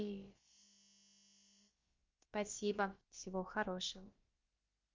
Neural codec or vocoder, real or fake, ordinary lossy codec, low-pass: codec, 16 kHz, about 1 kbps, DyCAST, with the encoder's durations; fake; Opus, 32 kbps; 7.2 kHz